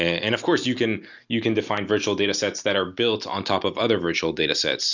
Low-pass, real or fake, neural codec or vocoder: 7.2 kHz; real; none